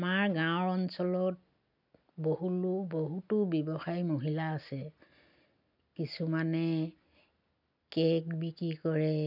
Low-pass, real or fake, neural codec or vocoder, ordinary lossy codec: 5.4 kHz; real; none; none